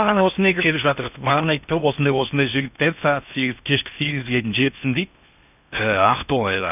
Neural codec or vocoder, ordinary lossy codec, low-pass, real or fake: codec, 16 kHz in and 24 kHz out, 0.6 kbps, FocalCodec, streaming, 2048 codes; none; 3.6 kHz; fake